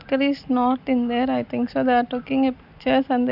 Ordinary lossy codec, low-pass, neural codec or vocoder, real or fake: none; 5.4 kHz; none; real